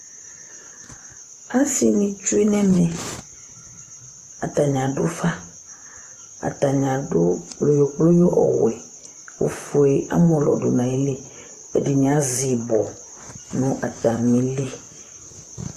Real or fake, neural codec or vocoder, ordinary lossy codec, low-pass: fake; vocoder, 44.1 kHz, 128 mel bands, Pupu-Vocoder; AAC, 64 kbps; 14.4 kHz